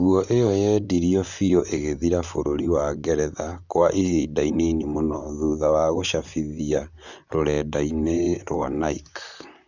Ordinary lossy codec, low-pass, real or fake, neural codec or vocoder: none; 7.2 kHz; fake; vocoder, 44.1 kHz, 128 mel bands, Pupu-Vocoder